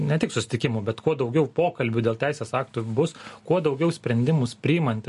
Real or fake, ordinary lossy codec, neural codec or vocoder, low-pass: real; MP3, 48 kbps; none; 14.4 kHz